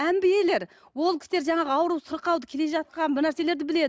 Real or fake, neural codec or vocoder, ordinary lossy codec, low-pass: real; none; none; none